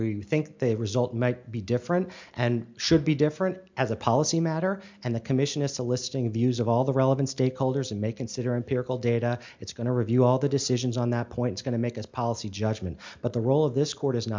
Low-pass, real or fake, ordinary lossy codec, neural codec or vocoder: 7.2 kHz; real; MP3, 64 kbps; none